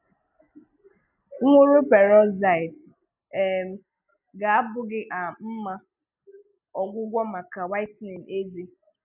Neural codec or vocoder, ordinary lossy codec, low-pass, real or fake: none; none; 3.6 kHz; real